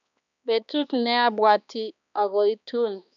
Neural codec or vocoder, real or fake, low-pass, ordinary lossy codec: codec, 16 kHz, 2 kbps, X-Codec, HuBERT features, trained on balanced general audio; fake; 7.2 kHz; none